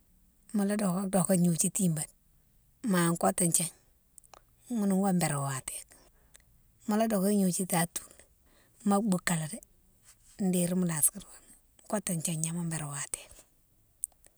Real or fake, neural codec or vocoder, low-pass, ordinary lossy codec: real; none; none; none